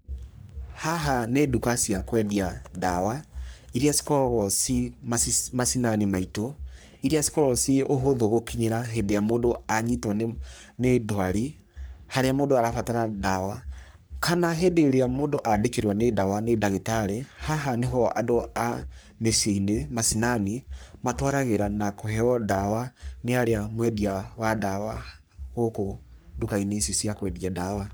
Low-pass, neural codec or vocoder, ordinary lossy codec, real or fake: none; codec, 44.1 kHz, 3.4 kbps, Pupu-Codec; none; fake